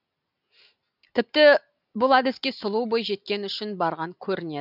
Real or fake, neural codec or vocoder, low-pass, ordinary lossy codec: real; none; 5.4 kHz; none